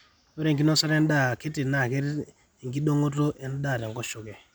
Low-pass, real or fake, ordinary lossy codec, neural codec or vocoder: none; real; none; none